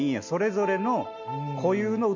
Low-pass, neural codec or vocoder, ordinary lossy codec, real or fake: 7.2 kHz; none; none; real